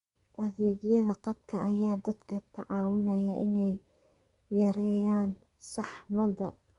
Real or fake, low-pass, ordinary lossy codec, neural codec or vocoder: fake; 10.8 kHz; Opus, 64 kbps; codec, 24 kHz, 1 kbps, SNAC